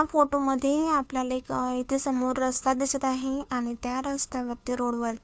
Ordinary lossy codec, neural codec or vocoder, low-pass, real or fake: none; codec, 16 kHz, 2 kbps, FunCodec, trained on Chinese and English, 25 frames a second; none; fake